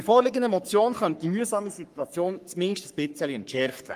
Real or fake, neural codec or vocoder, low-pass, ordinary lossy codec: fake; codec, 44.1 kHz, 3.4 kbps, Pupu-Codec; 14.4 kHz; Opus, 32 kbps